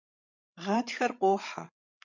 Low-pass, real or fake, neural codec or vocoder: 7.2 kHz; fake; codec, 16 kHz, 16 kbps, FreqCodec, larger model